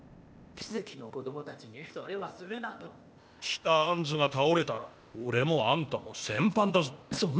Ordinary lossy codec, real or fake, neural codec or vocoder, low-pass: none; fake; codec, 16 kHz, 0.8 kbps, ZipCodec; none